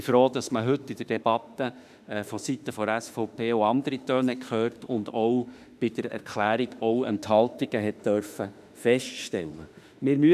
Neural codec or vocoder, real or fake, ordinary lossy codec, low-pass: autoencoder, 48 kHz, 32 numbers a frame, DAC-VAE, trained on Japanese speech; fake; none; 14.4 kHz